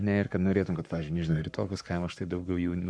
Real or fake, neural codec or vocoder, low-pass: fake; codec, 44.1 kHz, 7.8 kbps, Pupu-Codec; 9.9 kHz